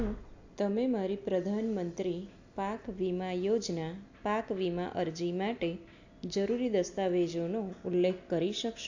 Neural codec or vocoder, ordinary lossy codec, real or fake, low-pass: none; AAC, 48 kbps; real; 7.2 kHz